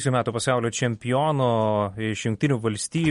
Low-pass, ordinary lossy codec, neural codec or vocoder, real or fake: 19.8 kHz; MP3, 48 kbps; none; real